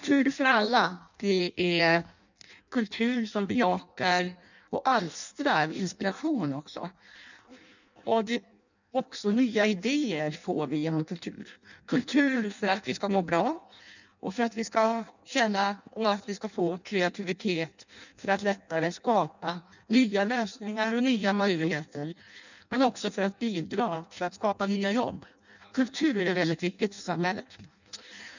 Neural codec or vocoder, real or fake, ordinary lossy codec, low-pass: codec, 16 kHz in and 24 kHz out, 0.6 kbps, FireRedTTS-2 codec; fake; none; 7.2 kHz